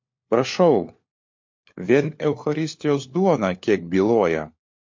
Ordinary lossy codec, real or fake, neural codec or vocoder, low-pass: MP3, 48 kbps; fake; codec, 16 kHz, 4 kbps, FunCodec, trained on LibriTTS, 50 frames a second; 7.2 kHz